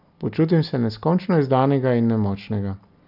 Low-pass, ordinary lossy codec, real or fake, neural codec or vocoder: 5.4 kHz; none; real; none